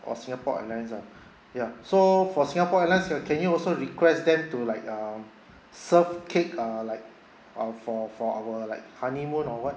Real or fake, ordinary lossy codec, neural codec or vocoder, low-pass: real; none; none; none